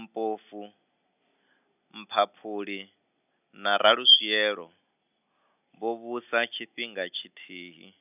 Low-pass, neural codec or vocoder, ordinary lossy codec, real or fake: 3.6 kHz; none; none; real